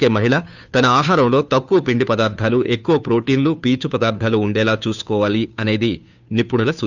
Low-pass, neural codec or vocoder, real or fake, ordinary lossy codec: 7.2 kHz; codec, 16 kHz, 2 kbps, FunCodec, trained on Chinese and English, 25 frames a second; fake; none